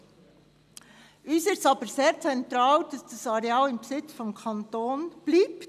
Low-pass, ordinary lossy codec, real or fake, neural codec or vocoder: 14.4 kHz; none; real; none